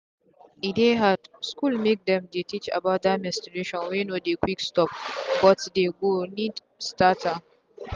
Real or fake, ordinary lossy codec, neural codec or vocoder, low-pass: real; Opus, 32 kbps; none; 7.2 kHz